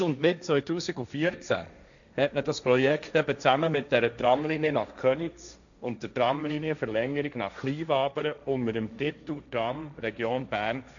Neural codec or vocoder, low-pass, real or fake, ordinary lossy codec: codec, 16 kHz, 1.1 kbps, Voila-Tokenizer; 7.2 kHz; fake; none